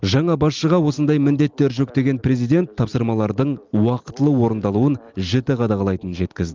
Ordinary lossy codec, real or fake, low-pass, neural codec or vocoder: Opus, 32 kbps; real; 7.2 kHz; none